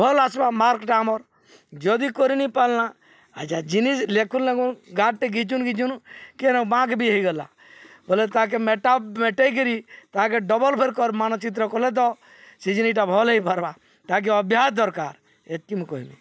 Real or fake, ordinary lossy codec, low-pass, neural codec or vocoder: real; none; none; none